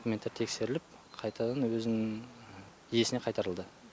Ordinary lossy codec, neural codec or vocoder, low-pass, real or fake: none; none; none; real